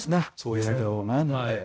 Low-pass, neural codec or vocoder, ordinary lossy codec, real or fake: none; codec, 16 kHz, 0.5 kbps, X-Codec, HuBERT features, trained on balanced general audio; none; fake